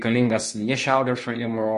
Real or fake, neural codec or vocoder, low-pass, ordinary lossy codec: fake; codec, 24 kHz, 0.9 kbps, WavTokenizer, medium speech release version 2; 10.8 kHz; MP3, 48 kbps